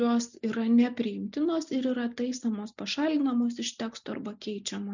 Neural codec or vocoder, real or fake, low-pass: none; real; 7.2 kHz